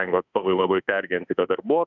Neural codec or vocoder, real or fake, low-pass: autoencoder, 48 kHz, 32 numbers a frame, DAC-VAE, trained on Japanese speech; fake; 7.2 kHz